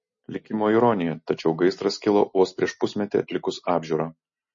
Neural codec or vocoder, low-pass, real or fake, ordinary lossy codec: none; 7.2 kHz; real; MP3, 32 kbps